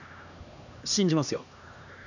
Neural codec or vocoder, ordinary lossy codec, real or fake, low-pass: codec, 16 kHz, 2 kbps, X-Codec, HuBERT features, trained on LibriSpeech; none; fake; 7.2 kHz